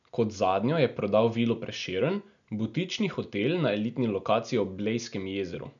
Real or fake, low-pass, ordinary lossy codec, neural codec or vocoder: real; 7.2 kHz; none; none